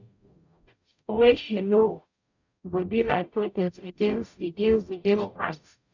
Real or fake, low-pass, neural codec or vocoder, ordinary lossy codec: fake; 7.2 kHz; codec, 44.1 kHz, 0.9 kbps, DAC; none